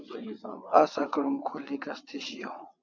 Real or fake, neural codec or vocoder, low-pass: fake; vocoder, 22.05 kHz, 80 mel bands, WaveNeXt; 7.2 kHz